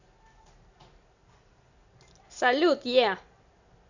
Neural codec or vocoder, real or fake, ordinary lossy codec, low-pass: none; real; none; 7.2 kHz